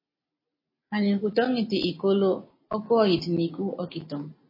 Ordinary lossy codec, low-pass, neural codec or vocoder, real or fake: MP3, 24 kbps; 5.4 kHz; none; real